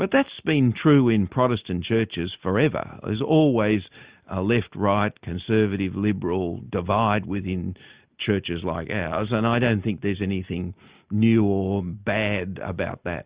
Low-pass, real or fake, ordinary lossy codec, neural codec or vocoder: 3.6 kHz; real; Opus, 64 kbps; none